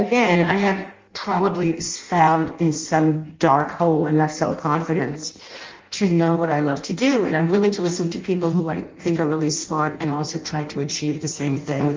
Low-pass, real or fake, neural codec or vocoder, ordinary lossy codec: 7.2 kHz; fake; codec, 16 kHz in and 24 kHz out, 0.6 kbps, FireRedTTS-2 codec; Opus, 32 kbps